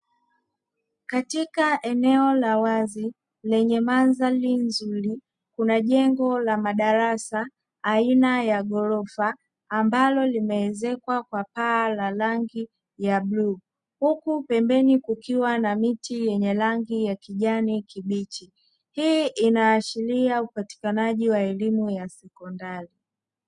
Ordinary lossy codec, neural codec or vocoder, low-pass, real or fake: Opus, 64 kbps; none; 10.8 kHz; real